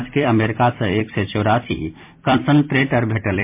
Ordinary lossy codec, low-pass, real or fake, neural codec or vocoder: MP3, 32 kbps; 3.6 kHz; fake; vocoder, 44.1 kHz, 128 mel bands every 256 samples, BigVGAN v2